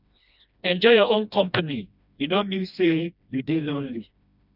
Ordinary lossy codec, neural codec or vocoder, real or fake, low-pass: none; codec, 16 kHz, 1 kbps, FreqCodec, smaller model; fake; 5.4 kHz